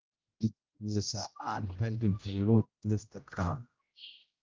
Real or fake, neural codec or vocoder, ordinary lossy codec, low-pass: fake; codec, 16 kHz, 0.5 kbps, X-Codec, HuBERT features, trained on general audio; Opus, 24 kbps; 7.2 kHz